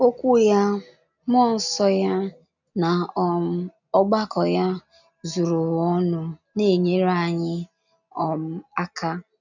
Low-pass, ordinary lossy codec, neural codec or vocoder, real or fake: 7.2 kHz; none; none; real